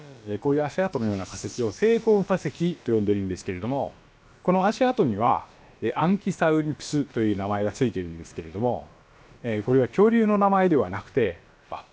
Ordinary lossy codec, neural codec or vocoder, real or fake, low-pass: none; codec, 16 kHz, about 1 kbps, DyCAST, with the encoder's durations; fake; none